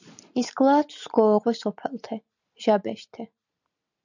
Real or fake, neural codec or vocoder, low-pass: real; none; 7.2 kHz